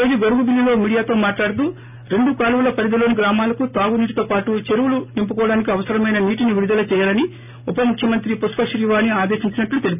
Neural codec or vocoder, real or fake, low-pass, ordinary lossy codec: none; real; 3.6 kHz; none